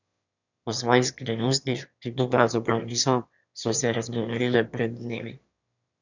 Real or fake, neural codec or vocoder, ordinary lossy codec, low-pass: fake; autoencoder, 22.05 kHz, a latent of 192 numbers a frame, VITS, trained on one speaker; none; 7.2 kHz